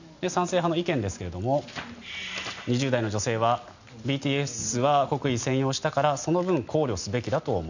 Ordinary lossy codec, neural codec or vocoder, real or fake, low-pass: none; none; real; 7.2 kHz